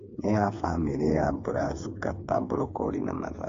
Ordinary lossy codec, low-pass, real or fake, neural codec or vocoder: AAC, 48 kbps; 7.2 kHz; fake; codec, 16 kHz, 4 kbps, FreqCodec, smaller model